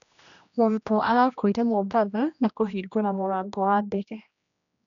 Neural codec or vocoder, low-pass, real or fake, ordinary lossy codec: codec, 16 kHz, 1 kbps, X-Codec, HuBERT features, trained on general audio; 7.2 kHz; fake; none